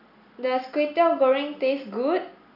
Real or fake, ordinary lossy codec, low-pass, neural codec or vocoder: real; none; 5.4 kHz; none